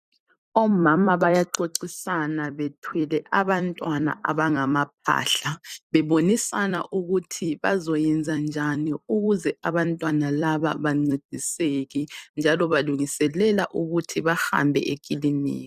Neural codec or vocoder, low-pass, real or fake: vocoder, 44.1 kHz, 128 mel bands every 256 samples, BigVGAN v2; 14.4 kHz; fake